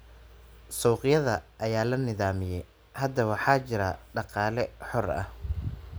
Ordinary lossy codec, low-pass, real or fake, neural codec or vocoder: none; none; real; none